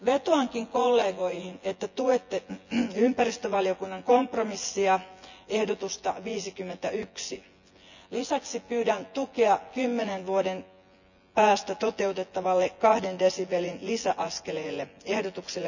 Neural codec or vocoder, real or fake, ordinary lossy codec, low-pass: vocoder, 24 kHz, 100 mel bands, Vocos; fake; none; 7.2 kHz